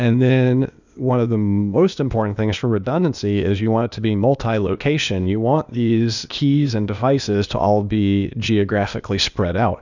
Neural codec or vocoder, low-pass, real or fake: codec, 16 kHz, 0.8 kbps, ZipCodec; 7.2 kHz; fake